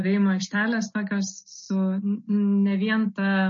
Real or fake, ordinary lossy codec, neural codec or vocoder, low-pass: real; MP3, 32 kbps; none; 7.2 kHz